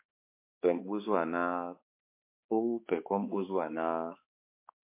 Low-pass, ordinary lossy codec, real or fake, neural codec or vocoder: 3.6 kHz; MP3, 24 kbps; fake; codec, 16 kHz, 4 kbps, X-Codec, HuBERT features, trained on balanced general audio